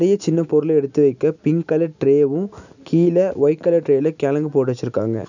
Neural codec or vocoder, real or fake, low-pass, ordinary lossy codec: none; real; 7.2 kHz; none